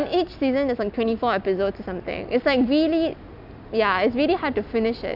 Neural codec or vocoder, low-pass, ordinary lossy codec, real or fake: none; 5.4 kHz; none; real